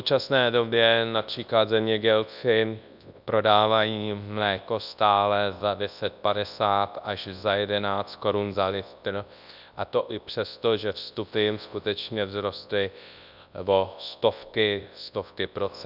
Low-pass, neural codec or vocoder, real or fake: 5.4 kHz; codec, 24 kHz, 0.9 kbps, WavTokenizer, large speech release; fake